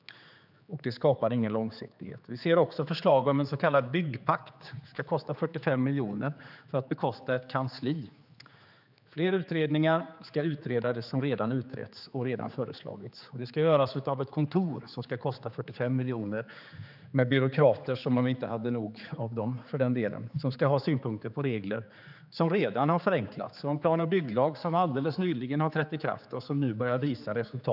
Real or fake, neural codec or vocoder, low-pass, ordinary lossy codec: fake; codec, 16 kHz, 4 kbps, X-Codec, HuBERT features, trained on general audio; 5.4 kHz; none